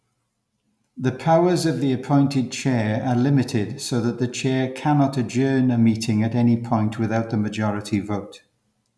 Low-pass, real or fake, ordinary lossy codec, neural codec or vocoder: none; real; none; none